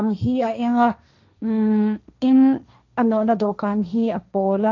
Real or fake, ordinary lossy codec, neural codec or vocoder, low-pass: fake; none; codec, 16 kHz, 1.1 kbps, Voila-Tokenizer; 7.2 kHz